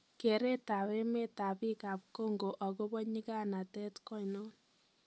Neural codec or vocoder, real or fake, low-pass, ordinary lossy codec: none; real; none; none